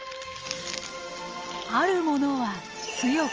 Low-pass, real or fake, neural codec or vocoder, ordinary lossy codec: 7.2 kHz; real; none; Opus, 24 kbps